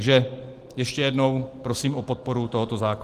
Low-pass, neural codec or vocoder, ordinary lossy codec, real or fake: 14.4 kHz; none; Opus, 24 kbps; real